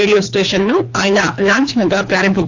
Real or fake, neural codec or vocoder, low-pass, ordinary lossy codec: fake; codec, 24 kHz, 3 kbps, HILCodec; 7.2 kHz; AAC, 48 kbps